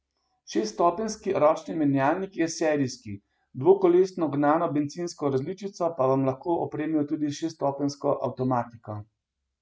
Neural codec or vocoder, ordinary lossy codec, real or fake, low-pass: none; none; real; none